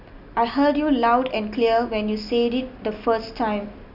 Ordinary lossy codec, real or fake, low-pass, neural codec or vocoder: none; real; 5.4 kHz; none